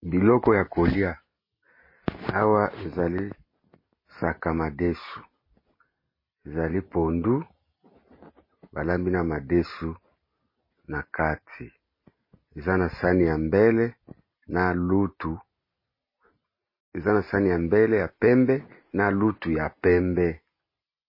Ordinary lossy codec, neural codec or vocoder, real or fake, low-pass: MP3, 24 kbps; none; real; 5.4 kHz